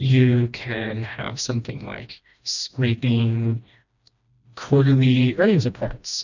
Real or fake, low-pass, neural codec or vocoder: fake; 7.2 kHz; codec, 16 kHz, 1 kbps, FreqCodec, smaller model